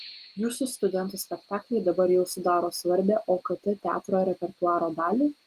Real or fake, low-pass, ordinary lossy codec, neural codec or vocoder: real; 14.4 kHz; Opus, 24 kbps; none